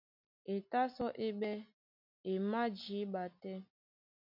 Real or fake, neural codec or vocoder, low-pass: real; none; 5.4 kHz